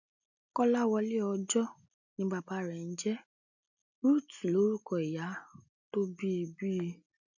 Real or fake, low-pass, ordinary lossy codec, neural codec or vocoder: real; 7.2 kHz; none; none